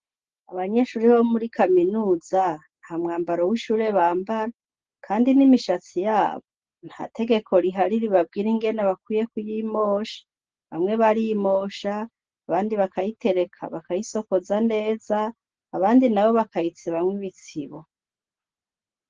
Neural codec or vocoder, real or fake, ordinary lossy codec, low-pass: none; real; Opus, 16 kbps; 7.2 kHz